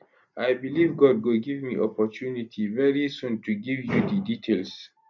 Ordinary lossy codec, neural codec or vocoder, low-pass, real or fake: none; none; 7.2 kHz; real